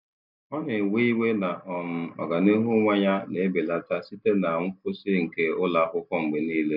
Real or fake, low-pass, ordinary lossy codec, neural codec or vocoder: real; 5.4 kHz; none; none